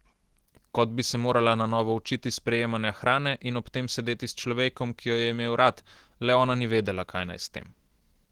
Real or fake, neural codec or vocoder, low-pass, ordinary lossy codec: fake; autoencoder, 48 kHz, 128 numbers a frame, DAC-VAE, trained on Japanese speech; 19.8 kHz; Opus, 16 kbps